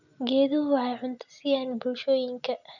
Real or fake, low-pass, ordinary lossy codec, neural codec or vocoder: real; 7.2 kHz; none; none